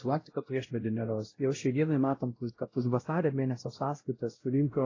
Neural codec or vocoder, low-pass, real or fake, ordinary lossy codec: codec, 16 kHz, 0.5 kbps, X-Codec, WavLM features, trained on Multilingual LibriSpeech; 7.2 kHz; fake; AAC, 32 kbps